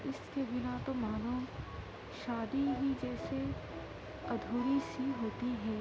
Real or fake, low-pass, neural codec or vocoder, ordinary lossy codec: real; none; none; none